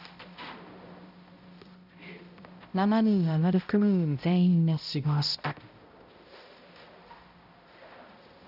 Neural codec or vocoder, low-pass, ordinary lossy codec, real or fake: codec, 16 kHz, 0.5 kbps, X-Codec, HuBERT features, trained on balanced general audio; 5.4 kHz; none; fake